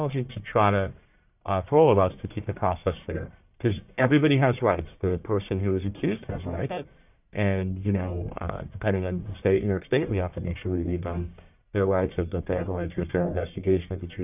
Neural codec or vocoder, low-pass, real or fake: codec, 44.1 kHz, 1.7 kbps, Pupu-Codec; 3.6 kHz; fake